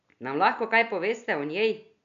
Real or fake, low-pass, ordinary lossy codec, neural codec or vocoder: real; 7.2 kHz; none; none